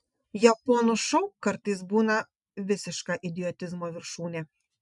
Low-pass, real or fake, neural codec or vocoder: 10.8 kHz; real; none